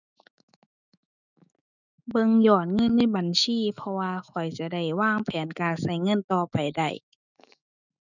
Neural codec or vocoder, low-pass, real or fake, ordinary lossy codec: autoencoder, 48 kHz, 128 numbers a frame, DAC-VAE, trained on Japanese speech; 7.2 kHz; fake; none